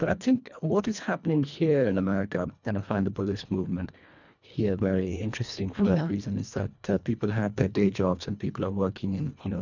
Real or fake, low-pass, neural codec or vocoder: fake; 7.2 kHz; codec, 24 kHz, 1.5 kbps, HILCodec